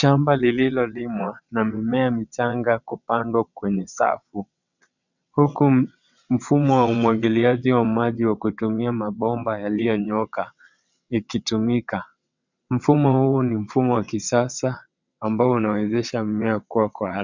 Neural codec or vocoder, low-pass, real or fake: vocoder, 22.05 kHz, 80 mel bands, WaveNeXt; 7.2 kHz; fake